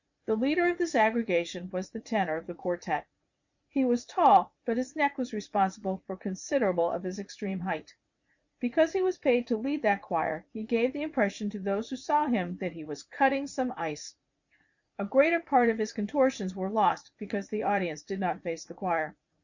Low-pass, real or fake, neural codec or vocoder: 7.2 kHz; fake; vocoder, 44.1 kHz, 80 mel bands, Vocos